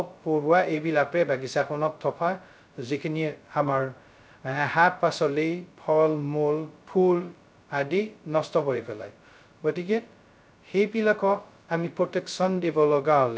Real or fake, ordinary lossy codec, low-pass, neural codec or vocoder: fake; none; none; codec, 16 kHz, 0.2 kbps, FocalCodec